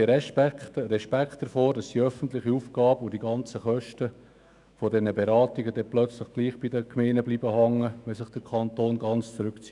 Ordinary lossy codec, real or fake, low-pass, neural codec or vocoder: none; fake; 10.8 kHz; autoencoder, 48 kHz, 128 numbers a frame, DAC-VAE, trained on Japanese speech